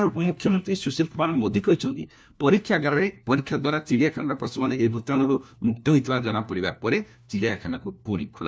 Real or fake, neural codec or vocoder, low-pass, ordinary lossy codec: fake; codec, 16 kHz, 1 kbps, FunCodec, trained on LibriTTS, 50 frames a second; none; none